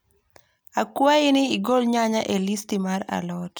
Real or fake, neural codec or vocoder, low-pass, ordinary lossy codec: real; none; none; none